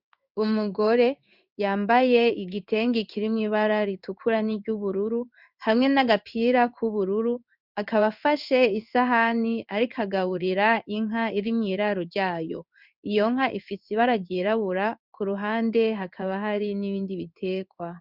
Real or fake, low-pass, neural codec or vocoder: fake; 5.4 kHz; codec, 16 kHz in and 24 kHz out, 1 kbps, XY-Tokenizer